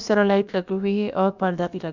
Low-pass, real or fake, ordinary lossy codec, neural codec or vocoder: 7.2 kHz; fake; none; codec, 16 kHz, about 1 kbps, DyCAST, with the encoder's durations